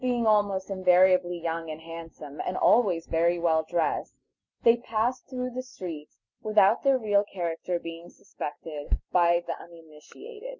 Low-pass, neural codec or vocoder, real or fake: 7.2 kHz; none; real